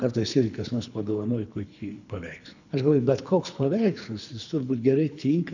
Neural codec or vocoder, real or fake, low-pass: codec, 24 kHz, 3 kbps, HILCodec; fake; 7.2 kHz